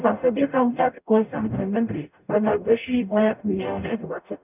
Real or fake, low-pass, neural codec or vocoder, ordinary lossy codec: fake; 3.6 kHz; codec, 44.1 kHz, 0.9 kbps, DAC; none